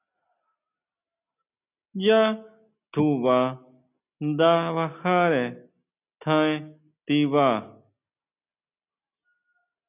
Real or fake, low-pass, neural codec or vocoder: real; 3.6 kHz; none